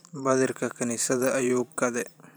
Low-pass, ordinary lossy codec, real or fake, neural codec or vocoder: none; none; fake; vocoder, 44.1 kHz, 128 mel bands every 512 samples, BigVGAN v2